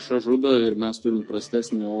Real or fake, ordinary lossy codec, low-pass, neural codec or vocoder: fake; MP3, 48 kbps; 10.8 kHz; codec, 44.1 kHz, 2.6 kbps, SNAC